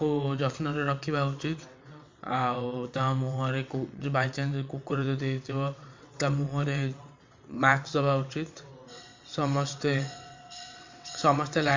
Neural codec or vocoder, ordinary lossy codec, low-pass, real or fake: vocoder, 22.05 kHz, 80 mel bands, WaveNeXt; MP3, 48 kbps; 7.2 kHz; fake